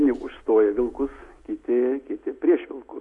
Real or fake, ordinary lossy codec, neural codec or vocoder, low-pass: real; Opus, 64 kbps; none; 10.8 kHz